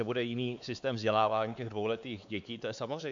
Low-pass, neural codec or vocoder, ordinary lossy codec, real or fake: 7.2 kHz; codec, 16 kHz, 4 kbps, X-Codec, WavLM features, trained on Multilingual LibriSpeech; MP3, 64 kbps; fake